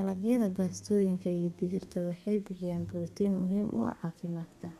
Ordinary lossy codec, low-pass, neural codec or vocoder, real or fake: none; 14.4 kHz; codec, 32 kHz, 1.9 kbps, SNAC; fake